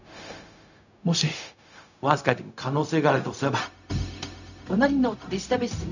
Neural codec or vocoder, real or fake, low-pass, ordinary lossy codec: codec, 16 kHz, 0.4 kbps, LongCat-Audio-Codec; fake; 7.2 kHz; none